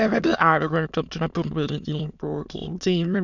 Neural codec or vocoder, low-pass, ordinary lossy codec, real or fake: autoencoder, 22.05 kHz, a latent of 192 numbers a frame, VITS, trained on many speakers; 7.2 kHz; none; fake